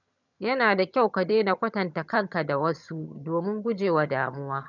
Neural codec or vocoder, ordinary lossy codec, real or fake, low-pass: vocoder, 22.05 kHz, 80 mel bands, HiFi-GAN; none; fake; 7.2 kHz